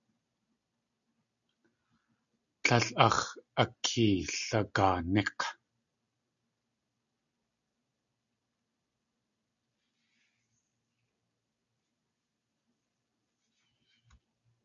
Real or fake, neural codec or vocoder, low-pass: real; none; 7.2 kHz